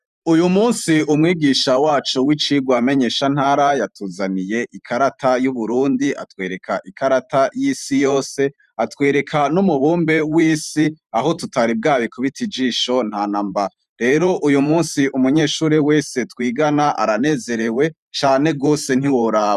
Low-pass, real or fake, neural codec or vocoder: 14.4 kHz; fake; vocoder, 44.1 kHz, 128 mel bands every 512 samples, BigVGAN v2